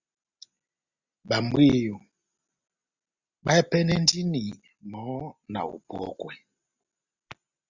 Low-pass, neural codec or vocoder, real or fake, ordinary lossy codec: 7.2 kHz; none; real; Opus, 64 kbps